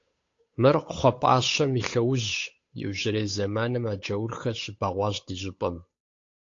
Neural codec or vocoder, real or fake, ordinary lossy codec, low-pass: codec, 16 kHz, 8 kbps, FunCodec, trained on Chinese and English, 25 frames a second; fake; AAC, 48 kbps; 7.2 kHz